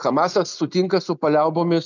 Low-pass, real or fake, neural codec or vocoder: 7.2 kHz; real; none